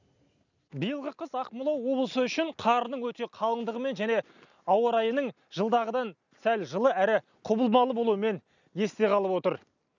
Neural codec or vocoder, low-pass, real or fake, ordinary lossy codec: none; 7.2 kHz; real; none